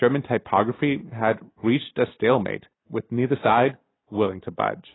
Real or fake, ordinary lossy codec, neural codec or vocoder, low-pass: real; AAC, 16 kbps; none; 7.2 kHz